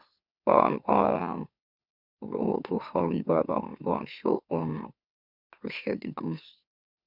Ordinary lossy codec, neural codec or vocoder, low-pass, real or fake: none; autoencoder, 44.1 kHz, a latent of 192 numbers a frame, MeloTTS; 5.4 kHz; fake